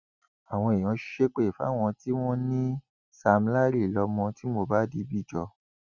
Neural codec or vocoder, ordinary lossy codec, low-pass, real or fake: none; none; 7.2 kHz; real